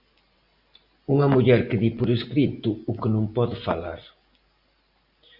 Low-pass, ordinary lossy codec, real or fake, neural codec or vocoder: 5.4 kHz; Opus, 64 kbps; real; none